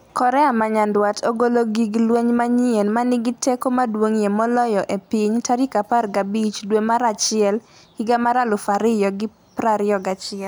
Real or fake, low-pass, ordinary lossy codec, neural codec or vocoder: real; none; none; none